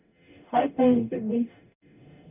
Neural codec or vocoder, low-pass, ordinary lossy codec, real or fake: codec, 44.1 kHz, 0.9 kbps, DAC; 3.6 kHz; none; fake